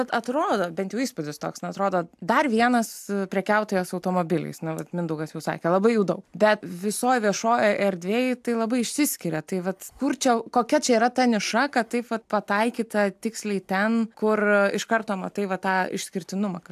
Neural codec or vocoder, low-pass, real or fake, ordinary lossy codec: none; 14.4 kHz; real; AAC, 96 kbps